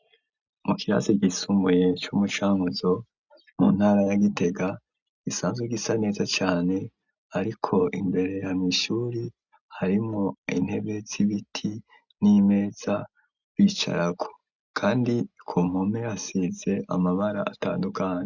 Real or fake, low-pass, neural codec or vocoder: real; 7.2 kHz; none